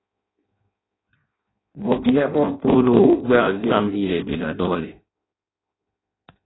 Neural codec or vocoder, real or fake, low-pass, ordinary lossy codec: codec, 16 kHz in and 24 kHz out, 0.6 kbps, FireRedTTS-2 codec; fake; 7.2 kHz; AAC, 16 kbps